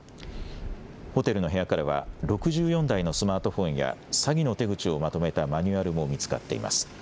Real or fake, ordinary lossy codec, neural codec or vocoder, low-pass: real; none; none; none